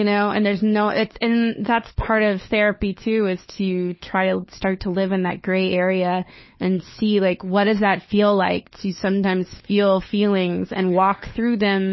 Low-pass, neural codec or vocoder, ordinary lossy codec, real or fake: 7.2 kHz; codec, 16 kHz, 4 kbps, FunCodec, trained on LibriTTS, 50 frames a second; MP3, 24 kbps; fake